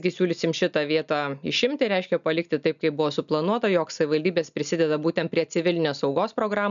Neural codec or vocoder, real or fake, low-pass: none; real; 7.2 kHz